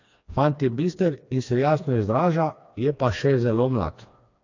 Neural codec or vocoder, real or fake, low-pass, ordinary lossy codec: codec, 16 kHz, 2 kbps, FreqCodec, smaller model; fake; 7.2 kHz; AAC, 48 kbps